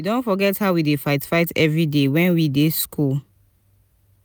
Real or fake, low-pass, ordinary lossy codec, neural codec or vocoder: real; none; none; none